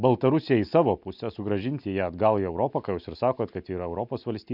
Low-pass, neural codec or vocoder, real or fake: 5.4 kHz; none; real